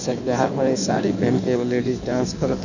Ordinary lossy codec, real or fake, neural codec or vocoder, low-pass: none; fake; codec, 16 kHz in and 24 kHz out, 1.1 kbps, FireRedTTS-2 codec; 7.2 kHz